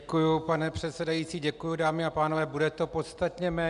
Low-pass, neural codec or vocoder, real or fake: 10.8 kHz; none; real